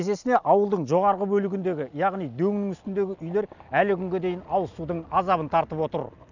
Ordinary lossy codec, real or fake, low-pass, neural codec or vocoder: none; real; 7.2 kHz; none